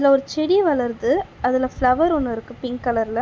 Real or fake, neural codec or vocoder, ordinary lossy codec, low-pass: real; none; none; none